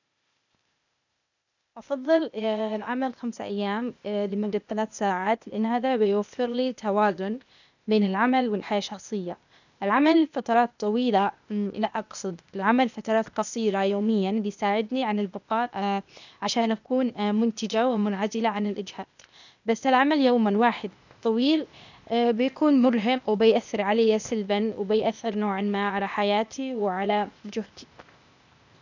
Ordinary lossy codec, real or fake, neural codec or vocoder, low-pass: none; fake; codec, 16 kHz, 0.8 kbps, ZipCodec; 7.2 kHz